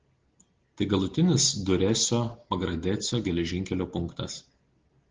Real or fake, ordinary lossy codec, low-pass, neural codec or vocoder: real; Opus, 16 kbps; 7.2 kHz; none